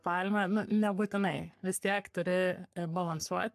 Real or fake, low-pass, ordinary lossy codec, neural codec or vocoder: fake; 14.4 kHz; AAC, 96 kbps; codec, 44.1 kHz, 3.4 kbps, Pupu-Codec